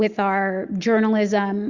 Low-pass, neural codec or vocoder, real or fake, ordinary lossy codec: 7.2 kHz; none; real; Opus, 64 kbps